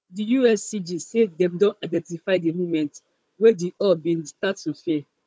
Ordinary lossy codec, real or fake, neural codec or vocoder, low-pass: none; fake; codec, 16 kHz, 16 kbps, FunCodec, trained on Chinese and English, 50 frames a second; none